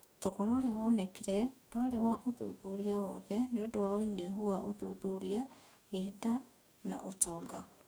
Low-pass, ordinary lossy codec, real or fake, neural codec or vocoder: none; none; fake; codec, 44.1 kHz, 2.6 kbps, DAC